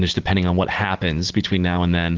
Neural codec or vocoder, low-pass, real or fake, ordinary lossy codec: none; 7.2 kHz; real; Opus, 16 kbps